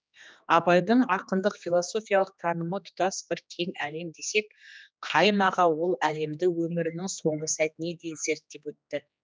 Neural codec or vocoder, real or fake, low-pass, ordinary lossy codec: codec, 16 kHz, 2 kbps, X-Codec, HuBERT features, trained on general audio; fake; none; none